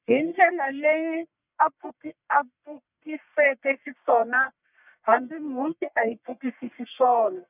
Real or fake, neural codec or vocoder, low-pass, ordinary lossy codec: fake; codec, 44.1 kHz, 1.7 kbps, Pupu-Codec; 3.6 kHz; none